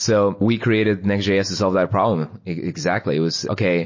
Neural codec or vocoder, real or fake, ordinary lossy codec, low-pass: none; real; MP3, 32 kbps; 7.2 kHz